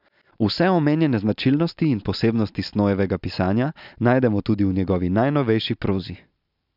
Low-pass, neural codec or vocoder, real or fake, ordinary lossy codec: 5.4 kHz; none; real; none